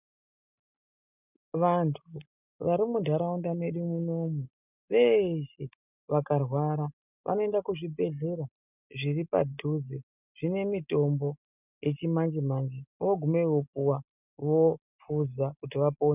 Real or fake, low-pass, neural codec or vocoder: real; 3.6 kHz; none